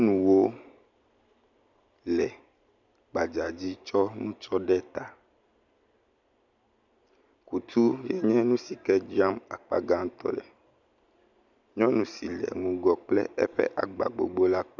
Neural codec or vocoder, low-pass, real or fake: none; 7.2 kHz; real